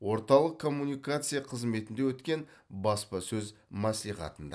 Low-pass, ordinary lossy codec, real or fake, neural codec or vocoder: none; none; real; none